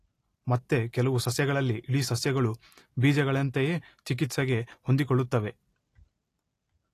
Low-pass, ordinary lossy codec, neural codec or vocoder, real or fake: 14.4 kHz; AAC, 48 kbps; none; real